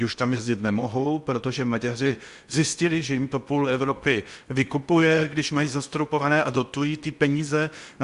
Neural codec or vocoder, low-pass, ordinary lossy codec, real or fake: codec, 16 kHz in and 24 kHz out, 0.8 kbps, FocalCodec, streaming, 65536 codes; 10.8 kHz; Opus, 64 kbps; fake